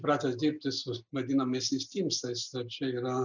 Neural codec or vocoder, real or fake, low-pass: none; real; 7.2 kHz